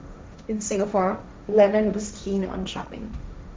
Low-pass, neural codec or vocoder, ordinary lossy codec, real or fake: none; codec, 16 kHz, 1.1 kbps, Voila-Tokenizer; none; fake